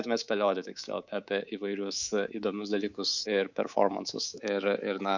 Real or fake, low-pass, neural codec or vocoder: fake; 7.2 kHz; codec, 24 kHz, 3.1 kbps, DualCodec